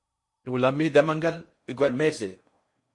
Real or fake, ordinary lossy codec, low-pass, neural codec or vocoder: fake; MP3, 48 kbps; 10.8 kHz; codec, 16 kHz in and 24 kHz out, 0.6 kbps, FocalCodec, streaming, 4096 codes